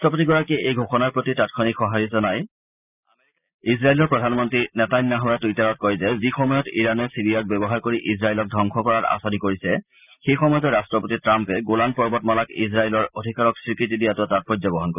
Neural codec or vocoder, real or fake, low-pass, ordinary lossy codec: none; real; 3.6 kHz; none